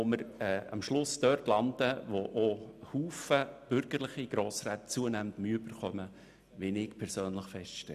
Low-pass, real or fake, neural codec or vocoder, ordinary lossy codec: 14.4 kHz; real; none; none